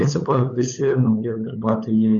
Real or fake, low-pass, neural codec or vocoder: fake; 7.2 kHz; codec, 16 kHz, 8 kbps, FunCodec, trained on LibriTTS, 25 frames a second